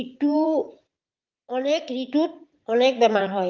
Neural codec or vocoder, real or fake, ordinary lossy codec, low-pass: vocoder, 44.1 kHz, 80 mel bands, Vocos; fake; Opus, 24 kbps; 7.2 kHz